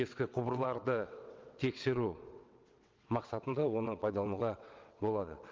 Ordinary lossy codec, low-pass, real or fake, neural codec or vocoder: Opus, 32 kbps; 7.2 kHz; fake; vocoder, 22.05 kHz, 80 mel bands, WaveNeXt